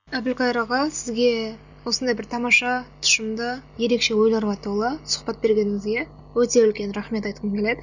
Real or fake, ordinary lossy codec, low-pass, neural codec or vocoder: real; none; 7.2 kHz; none